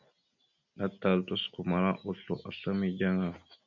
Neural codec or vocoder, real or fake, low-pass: none; real; 7.2 kHz